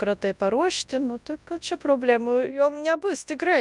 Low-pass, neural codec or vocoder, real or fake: 10.8 kHz; codec, 24 kHz, 0.9 kbps, WavTokenizer, large speech release; fake